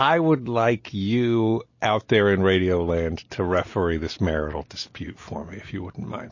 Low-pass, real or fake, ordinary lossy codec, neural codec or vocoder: 7.2 kHz; real; MP3, 32 kbps; none